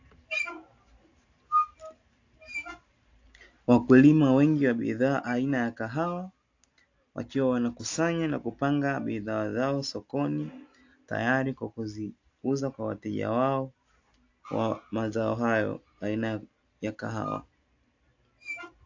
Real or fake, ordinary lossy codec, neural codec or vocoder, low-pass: real; AAC, 48 kbps; none; 7.2 kHz